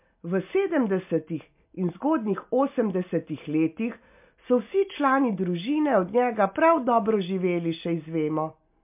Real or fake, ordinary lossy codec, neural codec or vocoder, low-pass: real; MP3, 32 kbps; none; 3.6 kHz